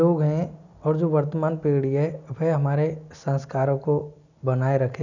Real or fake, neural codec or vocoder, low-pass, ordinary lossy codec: real; none; 7.2 kHz; none